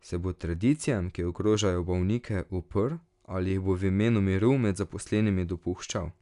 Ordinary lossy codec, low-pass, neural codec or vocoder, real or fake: none; 10.8 kHz; none; real